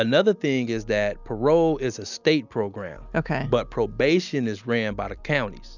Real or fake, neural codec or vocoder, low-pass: real; none; 7.2 kHz